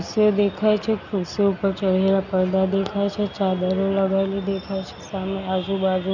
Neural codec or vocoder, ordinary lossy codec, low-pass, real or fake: none; none; 7.2 kHz; real